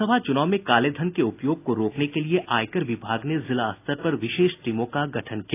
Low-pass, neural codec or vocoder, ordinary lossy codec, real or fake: 3.6 kHz; none; AAC, 24 kbps; real